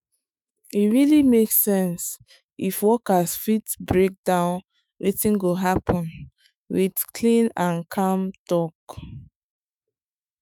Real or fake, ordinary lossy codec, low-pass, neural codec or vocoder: fake; none; none; autoencoder, 48 kHz, 128 numbers a frame, DAC-VAE, trained on Japanese speech